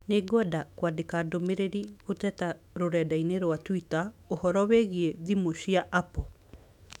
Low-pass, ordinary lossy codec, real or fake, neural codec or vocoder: 19.8 kHz; none; fake; autoencoder, 48 kHz, 128 numbers a frame, DAC-VAE, trained on Japanese speech